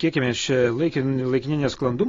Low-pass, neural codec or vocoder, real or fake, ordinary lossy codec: 7.2 kHz; none; real; AAC, 32 kbps